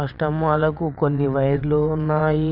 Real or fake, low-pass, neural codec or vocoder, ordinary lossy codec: fake; 5.4 kHz; vocoder, 22.05 kHz, 80 mel bands, WaveNeXt; none